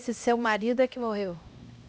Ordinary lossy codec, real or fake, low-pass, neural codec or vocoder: none; fake; none; codec, 16 kHz, 1 kbps, X-Codec, HuBERT features, trained on LibriSpeech